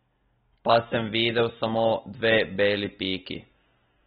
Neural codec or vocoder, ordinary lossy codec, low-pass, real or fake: none; AAC, 16 kbps; 14.4 kHz; real